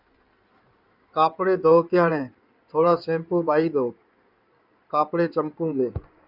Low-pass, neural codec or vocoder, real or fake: 5.4 kHz; codec, 16 kHz in and 24 kHz out, 2.2 kbps, FireRedTTS-2 codec; fake